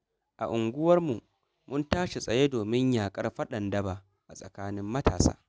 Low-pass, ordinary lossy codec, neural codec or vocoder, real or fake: none; none; none; real